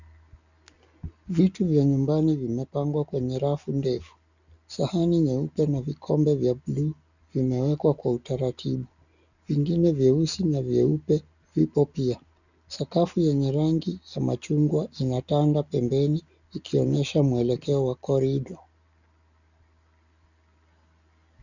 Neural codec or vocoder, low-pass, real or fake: none; 7.2 kHz; real